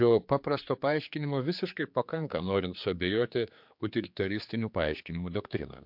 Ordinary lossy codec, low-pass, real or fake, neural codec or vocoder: MP3, 48 kbps; 5.4 kHz; fake; codec, 16 kHz, 4 kbps, X-Codec, HuBERT features, trained on general audio